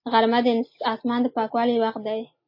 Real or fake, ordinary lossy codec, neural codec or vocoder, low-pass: real; MP3, 24 kbps; none; 5.4 kHz